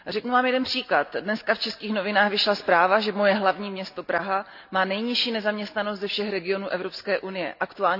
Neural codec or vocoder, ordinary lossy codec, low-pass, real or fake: none; none; 5.4 kHz; real